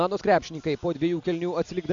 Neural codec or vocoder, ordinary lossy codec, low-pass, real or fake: none; AAC, 64 kbps; 7.2 kHz; real